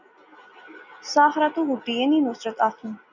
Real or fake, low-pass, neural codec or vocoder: real; 7.2 kHz; none